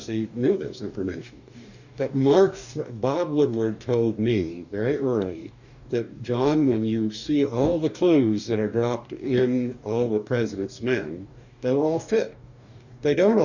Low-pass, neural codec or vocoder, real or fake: 7.2 kHz; codec, 44.1 kHz, 2.6 kbps, DAC; fake